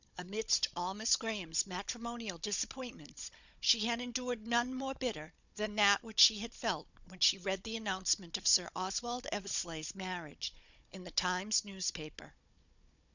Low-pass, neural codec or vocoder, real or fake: 7.2 kHz; codec, 16 kHz, 16 kbps, FunCodec, trained on Chinese and English, 50 frames a second; fake